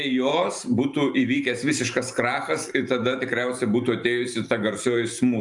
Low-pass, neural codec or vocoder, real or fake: 10.8 kHz; vocoder, 44.1 kHz, 128 mel bands every 512 samples, BigVGAN v2; fake